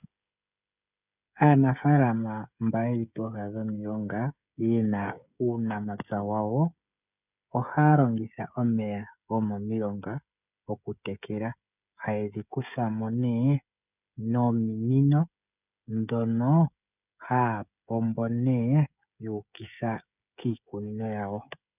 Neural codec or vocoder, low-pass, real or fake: codec, 16 kHz, 16 kbps, FreqCodec, smaller model; 3.6 kHz; fake